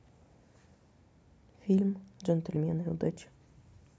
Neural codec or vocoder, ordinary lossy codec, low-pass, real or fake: none; none; none; real